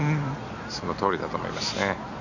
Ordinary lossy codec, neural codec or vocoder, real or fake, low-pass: none; none; real; 7.2 kHz